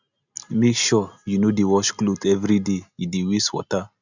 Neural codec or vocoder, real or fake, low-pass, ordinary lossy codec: none; real; 7.2 kHz; none